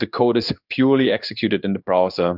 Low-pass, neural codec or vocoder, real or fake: 5.4 kHz; codec, 16 kHz in and 24 kHz out, 1 kbps, XY-Tokenizer; fake